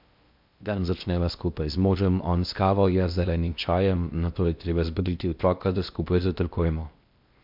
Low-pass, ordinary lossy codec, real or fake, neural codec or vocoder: 5.4 kHz; MP3, 48 kbps; fake; codec, 16 kHz in and 24 kHz out, 0.8 kbps, FocalCodec, streaming, 65536 codes